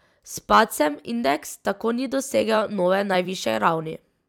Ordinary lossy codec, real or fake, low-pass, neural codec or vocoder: none; fake; 19.8 kHz; vocoder, 44.1 kHz, 128 mel bands every 256 samples, BigVGAN v2